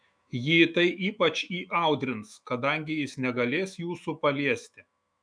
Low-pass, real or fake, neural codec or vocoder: 9.9 kHz; fake; autoencoder, 48 kHz, 128 numbers a frame, DAC-VAE, trained on Japanese speech